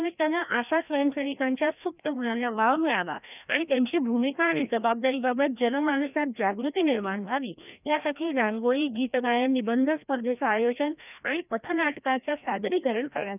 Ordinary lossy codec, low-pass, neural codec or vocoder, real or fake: none; 3.6 kHz; codec, 16 kHz, 1 kbps, FreqCodec, larger model; fake